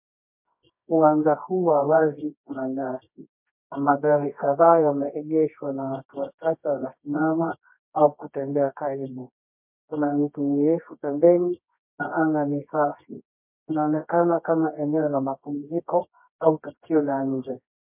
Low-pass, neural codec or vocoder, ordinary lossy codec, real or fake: 3.6 kHz; codec, 24 kHz, 0.9 kbps, WavTokenizer, medium music audio release; MP3, 32 kbps; fake